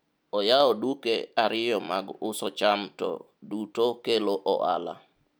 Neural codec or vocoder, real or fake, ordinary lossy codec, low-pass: none; real; none; none